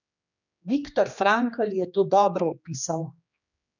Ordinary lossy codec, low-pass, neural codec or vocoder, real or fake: none; 7.2 kHz; codec, 16 kHz, 2 kbps, X-Codec, HuBERT features, trained on general audio; fake